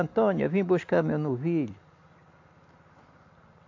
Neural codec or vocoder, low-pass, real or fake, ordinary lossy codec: none; 7.2 kHz; real; AAC, 48 kbps